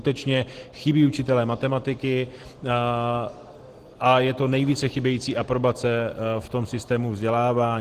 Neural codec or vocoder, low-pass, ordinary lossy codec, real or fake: none; 14.4 kHz; Opus, 16 kbps; real